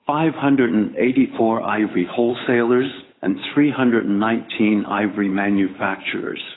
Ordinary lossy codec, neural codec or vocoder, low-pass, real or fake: AAC, 16 kbps; codec, 16 kHz, 8 kbps, FunCodec, trained on Chinese and English, 25 frames a second; 7.2 kHz; fake